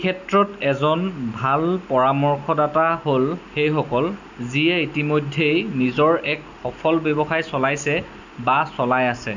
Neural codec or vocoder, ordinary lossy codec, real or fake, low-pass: none; none; real; 7.2 kHz